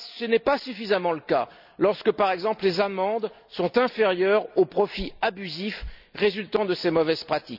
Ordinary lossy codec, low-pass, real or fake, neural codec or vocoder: none; 5.4 kHz; real; none